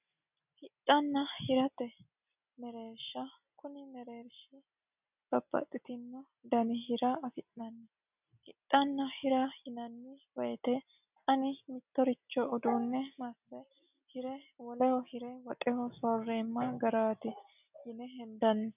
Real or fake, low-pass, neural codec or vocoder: real; 3.6 kHz; none